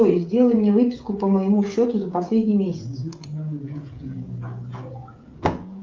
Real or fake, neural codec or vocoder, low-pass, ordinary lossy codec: fake; codec, 16 kHz, 16 kbps, FreqCodec, smaller model; 7.2 kHz; Opus, 24 kbps